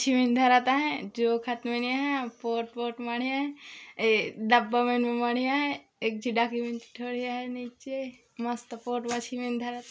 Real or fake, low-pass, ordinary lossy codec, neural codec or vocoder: real; none; none; none